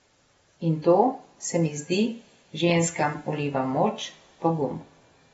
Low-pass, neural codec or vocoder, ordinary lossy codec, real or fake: 19.8 kHz; none; AAC, 24 kbps; real